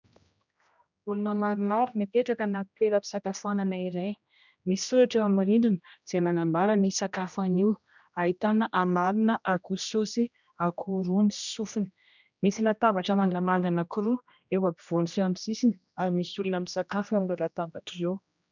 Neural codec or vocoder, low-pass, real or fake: codec, 16 kHz, 1 kbps, X-Codec, HuBERT features, trained on general audio; 7.2 kHz; fake